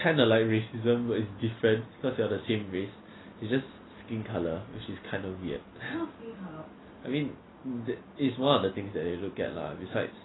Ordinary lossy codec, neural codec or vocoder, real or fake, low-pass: AAC, 16 kbps; none; real; 7.2 kHz